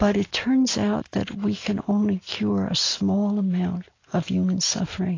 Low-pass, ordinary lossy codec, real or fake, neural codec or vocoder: 7.2 kHz; AAC, 32 kbps; real; none